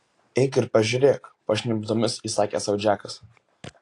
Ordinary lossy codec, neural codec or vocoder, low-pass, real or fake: AAC, 48 kbps; none; 10.8 kHz; real